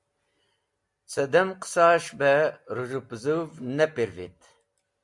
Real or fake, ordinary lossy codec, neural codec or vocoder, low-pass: real; MP3, 64 kbps; none; 10.8 kHz